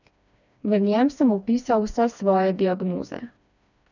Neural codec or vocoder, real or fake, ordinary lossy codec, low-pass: codec, 16 kHz, 2 kbps, FreqCodec, smaller model; fake; none; 7.2 kHz